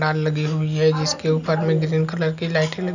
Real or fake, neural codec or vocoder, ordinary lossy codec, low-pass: real; none; none; 7.2 kHz